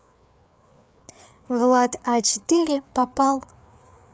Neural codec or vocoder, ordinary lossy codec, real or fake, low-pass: codec, 16 kHz, 4 kbps, FreqCodec, larger model; none; fake; none